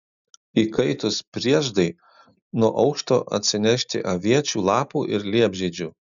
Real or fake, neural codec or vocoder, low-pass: real; none; 7.2 kHz